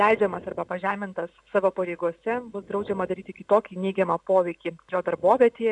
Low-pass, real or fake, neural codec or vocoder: 10.8 kHz; real; none